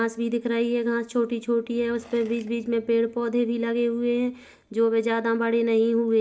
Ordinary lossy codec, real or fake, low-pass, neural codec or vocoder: none; real; none; none